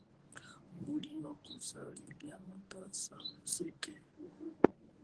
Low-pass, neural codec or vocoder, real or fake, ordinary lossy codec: 10.8 kHz; codec, 24 kHz, 0.9 kbps, WavTokenizer, medium speech release version 1; fake; Opus, 16 kbps